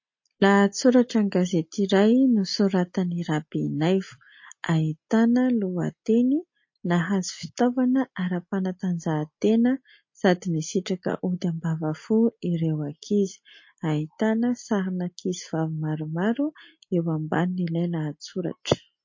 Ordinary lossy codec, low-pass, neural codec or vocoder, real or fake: MP3, 32 kbps; 7.2 kHz; none; real